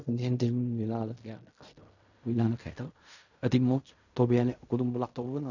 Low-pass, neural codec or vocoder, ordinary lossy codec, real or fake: 7.2 kHz; codec, 16 kHz in and 24 kHz out, 0.4 kbps, LongCat-Audio-Codec, fine tuned four codebook decoder; none; fake